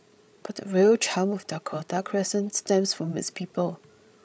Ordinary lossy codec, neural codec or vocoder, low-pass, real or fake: none; codec, 16 kHz, 16 kbps, FreqCodec, larger model; none; fake